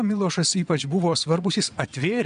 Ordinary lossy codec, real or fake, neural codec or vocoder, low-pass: AAC, 96 kbps; fake; vocoder, 22.05 kHz, 80 mel bands, WaveNeXt; 9.9 kHz